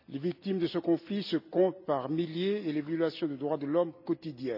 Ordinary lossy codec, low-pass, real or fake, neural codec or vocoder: none; 5.4 kHz; real; none